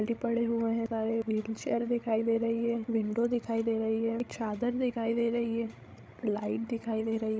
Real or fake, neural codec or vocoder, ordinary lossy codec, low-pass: fake; codec, 16 kHz, 16 kbps, FreqCodec, larger model; none; none